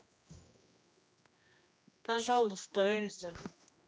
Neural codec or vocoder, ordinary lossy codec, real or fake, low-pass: codec, 16 kHz, 1 kbps, X-Codec, HuBERT features, trained on general audio; none; fake; none